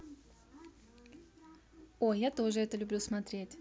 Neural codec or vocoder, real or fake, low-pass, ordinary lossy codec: none; real; none; none